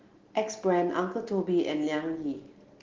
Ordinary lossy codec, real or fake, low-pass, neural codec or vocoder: Opus, 16 kbps; real; 7.2 kHz; none